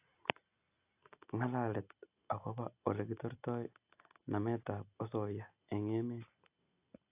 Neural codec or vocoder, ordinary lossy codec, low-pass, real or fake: none; AAC, 32 kbps; 3.6 kHz; real